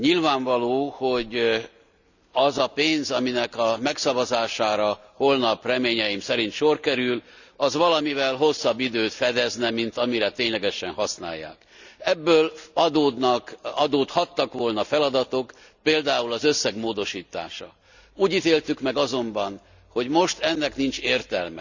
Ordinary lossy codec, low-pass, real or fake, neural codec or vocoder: none; 7.2 kHz; real; none